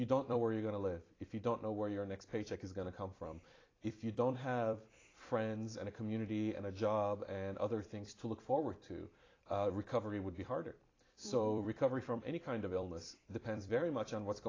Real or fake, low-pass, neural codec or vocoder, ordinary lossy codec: fake; 7.2 kHz; vocoder, 44.1 kHz, 128 mel bands every 256 samples, BigVGAN v2; AAC, 32 kbps